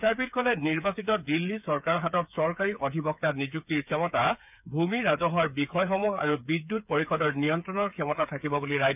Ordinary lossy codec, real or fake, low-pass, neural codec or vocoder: none; fake; 3.6 kHz; codec, 16 kHz, 8 kbps, FreqCodec, smaller model